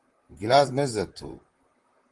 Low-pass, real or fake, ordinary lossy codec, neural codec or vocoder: 10.8 kHz; fake; Opus, 24 kbps; vocoder, 44.1 kHz, 128 mel bands, Pupu-Vocoder